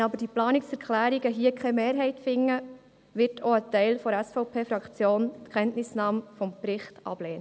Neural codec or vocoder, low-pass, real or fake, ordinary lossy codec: none; none; real; none